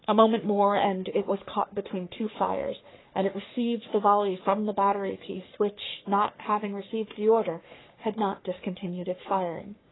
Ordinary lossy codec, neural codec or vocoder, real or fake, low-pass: AAC, 16 kbps; codec, 44.1 kHz, 3.4 kbps, Pupu-Codec; fake; 7.2 kHz